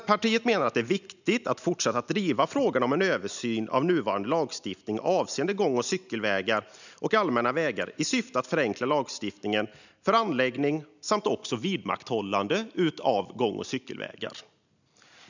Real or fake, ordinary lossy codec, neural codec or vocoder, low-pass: real; none; none; 7.2 kHz